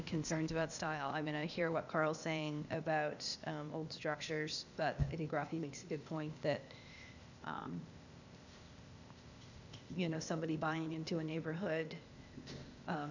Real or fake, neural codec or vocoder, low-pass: fake; codec, 16 kHz, 0.8 kbps, ZipCodec; 7.2 kHz